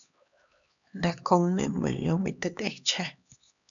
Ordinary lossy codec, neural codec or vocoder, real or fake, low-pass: MP3, 96 kbps; codec, 16 kHz, 2 kbps, X-Codec, HuBERT features, trained on LibriSpeech; fake; 7.2 kHz